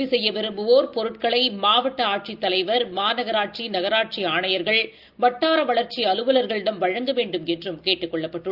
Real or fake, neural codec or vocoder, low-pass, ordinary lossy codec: real; none; 5.4 kHz; Opus, 32 kbps